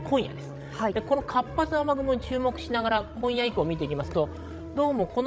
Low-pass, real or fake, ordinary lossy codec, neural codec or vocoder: none; fake; none; codec, 16 kHz, 16 kbps, FreqCodec, larger model